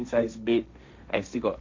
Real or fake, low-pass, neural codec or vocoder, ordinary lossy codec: fake; 7.2 kHz; codec, 16 kHz, 1.1 kbps, Voila-Tokenizer; MP3, 64 kbps